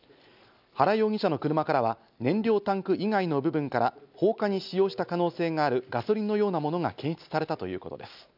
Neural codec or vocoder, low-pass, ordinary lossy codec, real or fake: none; 5.4 kHz; none; real